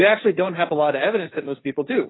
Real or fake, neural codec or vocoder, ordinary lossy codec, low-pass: fake; codec, 16 kHz, 1.1 kbps, Voila-Tokenizer; AAC, 16 kbps; 7.2 kHz